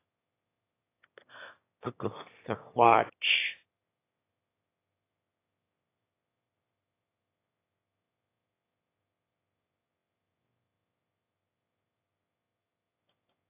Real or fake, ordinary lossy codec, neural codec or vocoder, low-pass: fake; AAC, 24 kbps; autoencoder, 22.05 kHz, a latent of 192 numbers a frame, VITS, trained on one speaker; 3.6 kHz